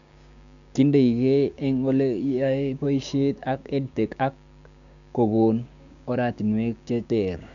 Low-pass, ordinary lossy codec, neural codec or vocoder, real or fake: 7.2 kHz; MP3, 96 kbps; codec, 16 kHz, 6 kbps, DAC; fake